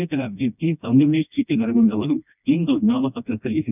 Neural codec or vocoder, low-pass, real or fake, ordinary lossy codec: codec, 16 kHz, 1 kbps, FreqCodec, smaller model; 3.6 kHz; fake; none